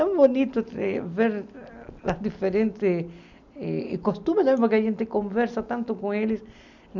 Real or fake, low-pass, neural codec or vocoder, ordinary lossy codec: real; 7.2 kHz; none; none